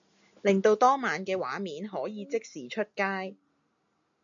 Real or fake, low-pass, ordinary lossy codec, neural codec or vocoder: real; 7.2 kHz; MP3, 96 kbps; none